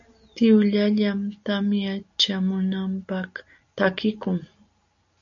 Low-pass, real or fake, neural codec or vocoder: 7.2 kHz; real; none